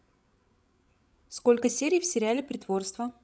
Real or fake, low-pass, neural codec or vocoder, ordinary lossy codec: fake; none; codec, 16 kHz, 16 kbps, FreqCodec, larger model; none